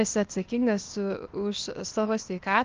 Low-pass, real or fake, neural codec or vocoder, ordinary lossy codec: 7.2 kHz; fake; codec, 16 kHz, 0.8 kbps, ZipCodec; Opus, 32 kbps